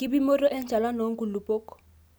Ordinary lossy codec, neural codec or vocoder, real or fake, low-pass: none; none; real; none